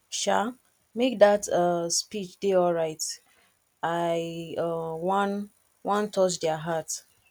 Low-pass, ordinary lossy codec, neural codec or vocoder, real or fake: 19.8 kHz; none; none; real